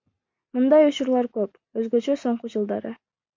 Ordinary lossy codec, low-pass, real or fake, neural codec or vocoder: MP3, 48 kbps; 7.2 kHz; real; none